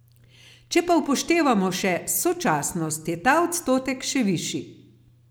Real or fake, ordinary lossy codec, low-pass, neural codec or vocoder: real; none; none; none